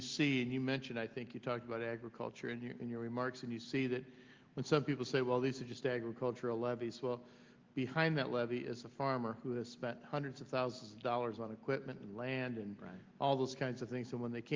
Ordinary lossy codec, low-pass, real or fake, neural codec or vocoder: Opus, 32 kbps; 7.2 kHz; real; none